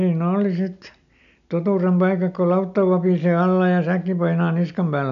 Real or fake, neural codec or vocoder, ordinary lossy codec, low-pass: real; none; none; 7.2 kHz